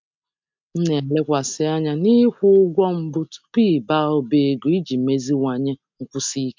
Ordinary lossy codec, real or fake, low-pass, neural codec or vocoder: MP3, 64 kbps; real; 7.2 kHz; none